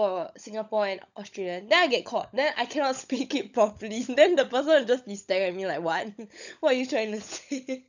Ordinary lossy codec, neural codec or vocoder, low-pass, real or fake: none; codec, 16 kHz, 16 kbps, FunCodec, trained on LibriTTS, 50 frames a second; 7.2 kHz; fake